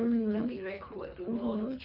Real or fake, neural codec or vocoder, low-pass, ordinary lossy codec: fake; codec, 24 kHz, 3 kbps, HILCodec; 5.4 kHz; none